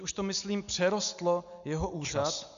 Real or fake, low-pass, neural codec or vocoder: real; 7.2 kHz; none